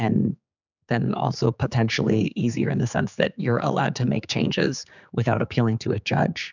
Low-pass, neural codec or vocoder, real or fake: 7.2 kHz; codec, 16 kHz, 4 kbps, X-Codec, HuBERT features, trained on general audio; fake